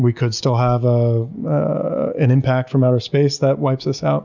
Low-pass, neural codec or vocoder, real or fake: 7.2 kHz; none; real